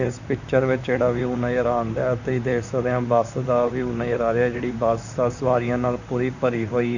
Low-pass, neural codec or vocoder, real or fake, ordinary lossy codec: 7.2 kHz; vocoder, 44.1 kHz, 128 mel bands, Pupu-Vocoder; fake; none